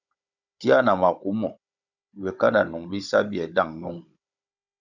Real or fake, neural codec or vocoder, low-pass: fake; codec, 16 kHz, 16 kbps, FunCodec, trained on Chinese and English, 50 frames a second; 7.2 kHz